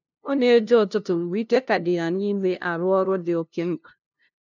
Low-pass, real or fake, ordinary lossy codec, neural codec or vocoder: 7.2 kHz; fake; none; codec, 16 kHz, 0.5 kbps, FunCodec, trained on LibriTTS, 25 frames a second